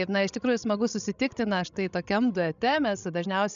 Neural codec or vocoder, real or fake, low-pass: codec, 16 kHz, 16 kbps, FreqCodec, larger model; fake; 7.2 kHz